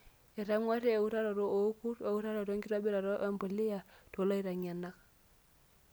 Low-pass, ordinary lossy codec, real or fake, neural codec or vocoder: none; none; real; none